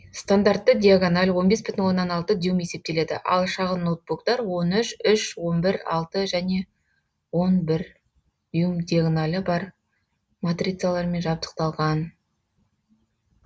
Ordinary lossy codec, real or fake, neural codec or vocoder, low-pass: none; real; none; none